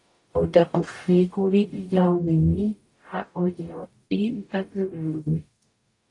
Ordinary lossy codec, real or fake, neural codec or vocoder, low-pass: AAC, 48 kbps; fake; codec, 44.1 kHz, 0.9 kbps, DAC; 10.8 kHz